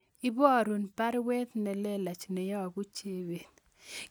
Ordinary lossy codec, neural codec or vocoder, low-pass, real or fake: none; none; none; real